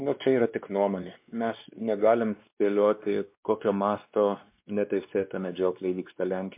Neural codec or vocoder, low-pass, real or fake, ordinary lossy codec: codec, 16 kHz, 2 kbps, X-Codec, WavLM features, trained on Multilingual LibriSpeech; 3.6 kHz; fake; MP3, 32 kbps